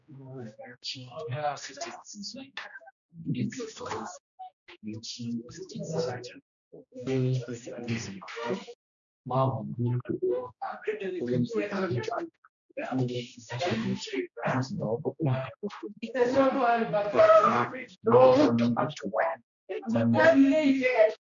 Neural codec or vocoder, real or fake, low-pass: codec, 16 kHz, 1 kbps, X-Codec, HuBERT features, trained on general audio; fake; 7.2 kHz